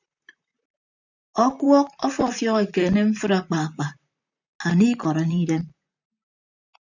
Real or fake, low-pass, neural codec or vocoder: fake; 7.2 kHz; vocoder, 44.1 kHz, 128 mel bands, Pupu-Vocoder